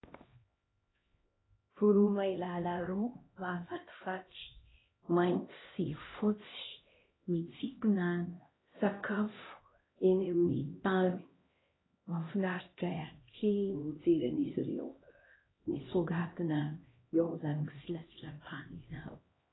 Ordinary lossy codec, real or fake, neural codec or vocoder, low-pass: AAC, 16 kbps; fake; codec, 16 kHz, 1 kbps, X-Codec, HuBERT features, trained on LibriSpeech; 7.2 kHz